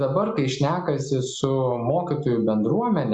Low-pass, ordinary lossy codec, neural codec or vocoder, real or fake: 10.8 kHz; Opus, 64 kbps; none; real